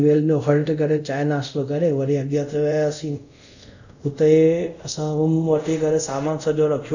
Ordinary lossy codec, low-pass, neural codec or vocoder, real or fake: none; 7.2 kHz; codec, 24 kHz, 0.5 kbps, DualCodec; fake